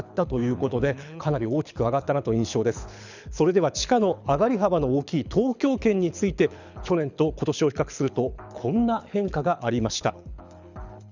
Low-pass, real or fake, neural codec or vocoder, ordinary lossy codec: 7.2 kHz; fake; codec, 24 kHz, 6 kbps, HILCodec; none